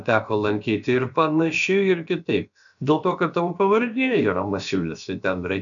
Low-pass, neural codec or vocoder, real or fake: 7.2 kHz; codec, 16 kHz, about 1 kbps, DyCAST, with the encoder's durations; fake